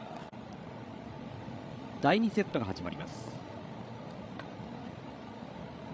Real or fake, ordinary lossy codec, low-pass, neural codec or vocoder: fake; none; none; codec, 16 kHz, 16 kbps, FreqCodec, larger model